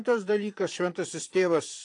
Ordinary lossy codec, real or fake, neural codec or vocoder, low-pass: AAC, 48 kbps; fake; vocoder, 22.05 kHz, 80 mel bands, Vocos; 9.9 kHz